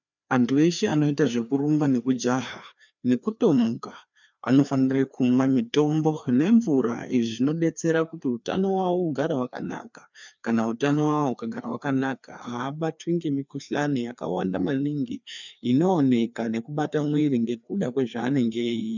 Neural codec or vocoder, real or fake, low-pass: codec, 16 kHz, 2 kbps, FreqCodec, larger model; fake; 7.2 kHz